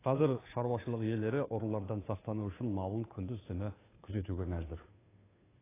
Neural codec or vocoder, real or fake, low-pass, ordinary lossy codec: codec, 16 kHz, 2 kbps, FunCodec, trained on Chinese and English, 25 frames a second; fake; 3.6 kHz; AAC, 16 kbps